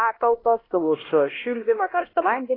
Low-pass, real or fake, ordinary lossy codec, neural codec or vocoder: 5.4 kHz; fake; AAC, 24 kbps; codec, 16 kHz, 1 kbps, X-Codec, HuBERT features, trained on LibriSpeech